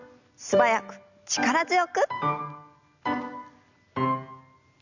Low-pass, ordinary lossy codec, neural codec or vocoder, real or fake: 7.2 kHz; none; none; real